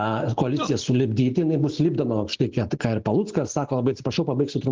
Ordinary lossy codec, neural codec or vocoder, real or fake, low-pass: Opus, 16 kbps; none; real; 7.2 kHz